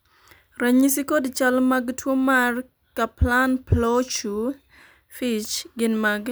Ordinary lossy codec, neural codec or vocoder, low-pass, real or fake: none; none; none; real